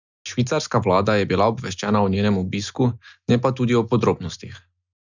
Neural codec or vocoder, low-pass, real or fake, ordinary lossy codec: none; 7.2 kHz; real; none